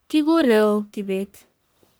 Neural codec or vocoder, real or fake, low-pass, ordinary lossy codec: codec, 44.1 kHz, 1.7 kbps, Pupu-Codec; fake; none; none